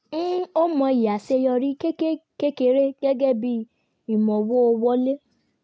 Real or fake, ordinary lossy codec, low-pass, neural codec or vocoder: real; none; none; none